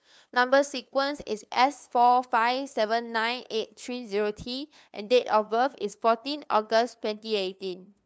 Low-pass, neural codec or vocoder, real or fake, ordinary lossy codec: none; codec, 16 kHz, 2 kbps, FunCodec, trained on LibriTTS, 25 frames a second; fake; none